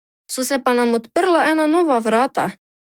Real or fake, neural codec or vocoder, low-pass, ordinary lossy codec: fake; codec, 44.1 kHz, 7.8 kbps, DAC; 19.8 kHz; Opus, 64 kbps